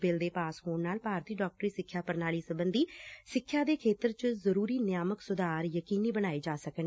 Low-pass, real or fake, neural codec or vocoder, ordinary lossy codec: none; real; none; none